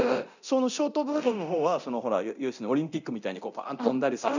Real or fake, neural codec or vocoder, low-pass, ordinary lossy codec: fake; codec, 24 kHz, 0.9 kbps, DualCodec; 7.2 kHz; none